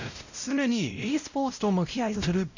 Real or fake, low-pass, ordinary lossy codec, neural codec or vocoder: fake; 7.2 kHz; none; codec, 16 kHz, 0.5 kbps, X-Codec, WavLM features, trained on Multilingual LibriSpeech